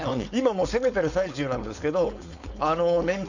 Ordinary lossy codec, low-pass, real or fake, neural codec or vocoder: none; 7.2 kHz; fake; codec, 16 kHz, 4.8 kbps, FACodec